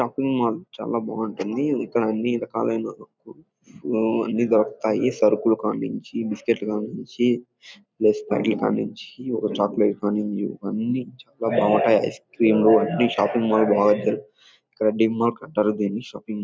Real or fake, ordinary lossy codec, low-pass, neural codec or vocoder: real; none; none; none